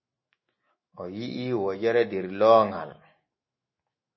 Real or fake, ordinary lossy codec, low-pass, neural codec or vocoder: fake; MP3, 24 kbps; 7.2 kHz; autoencoder, 48 kHz, 128 numbers a frame, DAC-VAE, trained on Japanese speech